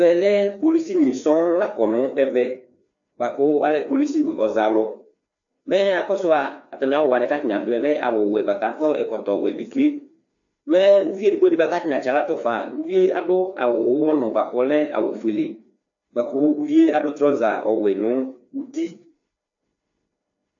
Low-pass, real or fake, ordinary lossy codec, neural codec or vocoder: 7.2 kHz; fake; AAC, 64 kbps; codec, 16 kHz, 2 kbps, FreqCodec, larger model